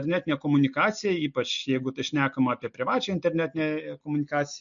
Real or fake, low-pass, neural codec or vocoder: real; 7.2 kHz; none